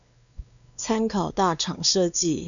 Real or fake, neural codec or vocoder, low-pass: fake; codec, 16 kHz, 2 kbps, X-Codec, WavLM features, trained on Multilingual LibriSpeech; 7.2 kHz